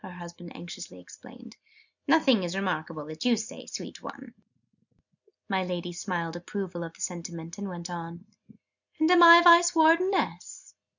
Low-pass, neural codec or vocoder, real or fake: 7.2 kHz; none; real